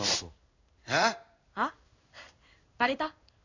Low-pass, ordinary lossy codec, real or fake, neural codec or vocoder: 7.2 kHz; none; fake; codec, 16 kHz in and 24 kHz out, 1 kbps, XY-Tokenizer